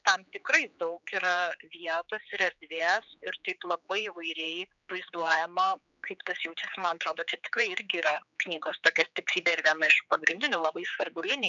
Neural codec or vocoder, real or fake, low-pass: codec, 16 kHz, 4 kbps, X-Codec, HuBERT features, trained on general audio; fake; 7.2 kHz